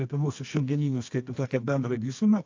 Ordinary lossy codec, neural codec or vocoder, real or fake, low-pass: AAC, 48 kbps; codec, 24 kHz, 0.9 kbps, WavTokenizer, medium music audio release; fake; 7.2 kHz